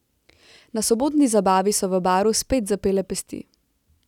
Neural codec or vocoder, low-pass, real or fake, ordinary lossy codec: none; 19.8 kHz; real; none